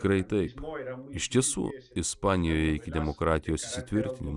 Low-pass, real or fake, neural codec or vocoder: 10.8 kHz; real; none